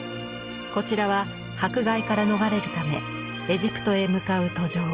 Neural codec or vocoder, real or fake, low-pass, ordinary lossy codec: none; real; 3.6 kHz; Opus, 32 kbps